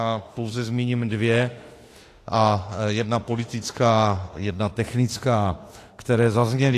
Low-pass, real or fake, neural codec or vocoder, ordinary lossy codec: 14.4 kHz; fake; autoencoder, 48 kHz, 32 numbers a frame, DAC-VAE, trained on Japanese speech; AAC, 48 kbps